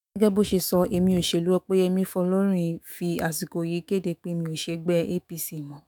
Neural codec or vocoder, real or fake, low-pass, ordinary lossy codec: autoencoder, 48 kHz, 128 numbers a frame, DAC-VAE, trained on Japanese speech; fake; none; none